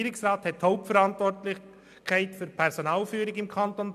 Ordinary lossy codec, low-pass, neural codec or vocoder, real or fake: MP3, 96 kbps; 14.4 kHz; none; real